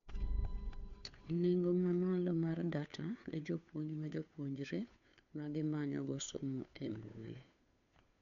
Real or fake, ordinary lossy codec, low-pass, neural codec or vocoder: fake; none; 7.2 kHz; codec, 16 kHz, 2 kbps, FunCodec, trained on Chinese and English, 25 frames a second